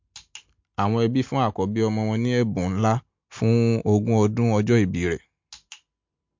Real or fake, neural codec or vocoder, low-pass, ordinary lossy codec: real; none; 7.2 kHz; MP3, 48 kbps